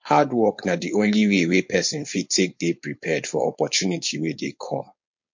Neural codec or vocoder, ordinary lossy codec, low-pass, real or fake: codec, 16 kHz, 4.8 kbps, FACodec; MP3, 48 kbps; 7.2 kHz; fake